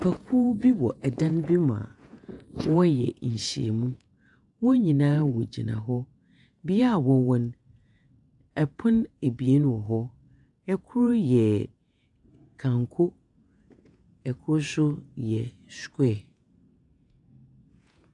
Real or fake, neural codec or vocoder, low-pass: fake; vocoder, 48 kHz, 128 mel bands, Vocos; 10.8 kHz